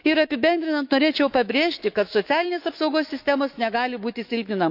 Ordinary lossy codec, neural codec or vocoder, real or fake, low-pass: none; autoencoder, 48 kHz, 128 numbers a frame, DAC-VAE, trained on Japanese speech; fake; 5.4 kHz